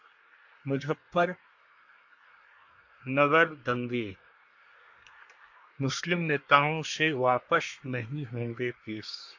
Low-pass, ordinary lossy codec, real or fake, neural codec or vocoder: 7.2 kHz; AAC, 48 kbps; fake; codec, 24 kHz, 1 kbps, SNAC